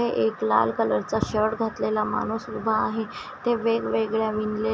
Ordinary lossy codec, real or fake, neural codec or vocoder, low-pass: none; real; none; none